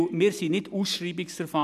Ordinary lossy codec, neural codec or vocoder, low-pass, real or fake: none; none; 14.4 kHz; real